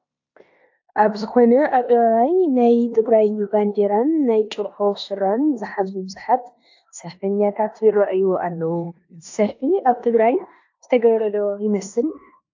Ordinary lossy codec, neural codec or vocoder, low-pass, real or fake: AAC, 48 kbps; codec, 16 kHz in and 24 kHz out, 0.9 kbps, LongCat-Audio-Codec, four codebook decoder; 7.2 kHz; fake